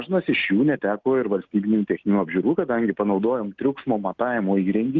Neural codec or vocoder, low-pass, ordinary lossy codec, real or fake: none; 7.2 kHz; Opus, 32 kbps; real